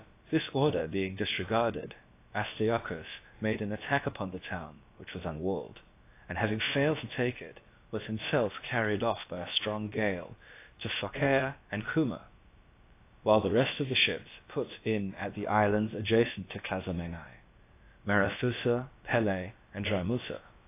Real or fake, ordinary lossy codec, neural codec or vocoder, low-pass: fake; AAC, 24 kbps; codec, 16 kHz, about 1 kbps, DyCAST, with the encoder's durations; 3.6 kHz